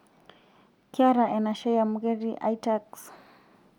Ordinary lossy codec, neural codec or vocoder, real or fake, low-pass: none; none; real; 19.8 kHz